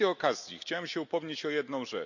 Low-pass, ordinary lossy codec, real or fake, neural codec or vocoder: 7.2 kHz; none; real; none